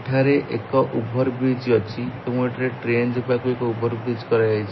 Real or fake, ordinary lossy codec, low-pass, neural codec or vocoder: real; MP3, 24 kbps; 7.2 kHz; none